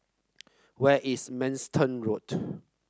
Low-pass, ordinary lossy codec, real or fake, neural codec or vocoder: none; none; real; none